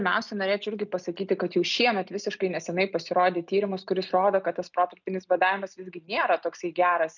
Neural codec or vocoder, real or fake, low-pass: none; real; 7.2 kHz